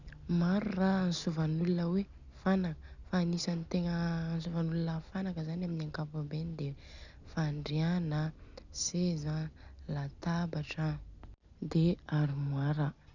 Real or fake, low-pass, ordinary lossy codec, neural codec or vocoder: real; 7.2 kHz; none; none